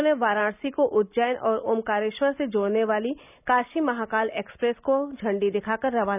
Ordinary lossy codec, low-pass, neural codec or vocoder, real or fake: none; 3.6 kHz; none; real